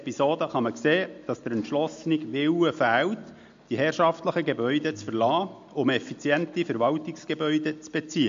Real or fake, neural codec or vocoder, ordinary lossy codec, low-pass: real; none; MP3, 48 kbps; 7.2 kHz